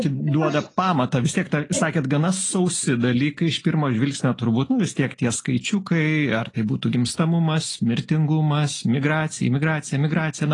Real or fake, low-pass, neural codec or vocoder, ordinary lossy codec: real; 10.8 kHz; none; AAC, 32 kbps